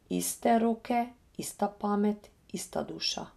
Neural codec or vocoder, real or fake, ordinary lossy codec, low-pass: none; real; none; 14.4 kHz